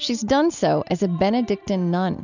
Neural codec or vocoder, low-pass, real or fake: none; 7.2 kHz; real